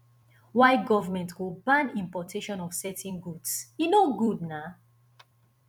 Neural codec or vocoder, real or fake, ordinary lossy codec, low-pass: vocoder, 44.1 kHz, 128 mel bands every 256 samples, BigVGAN v2; fake; none; 19.8 kHz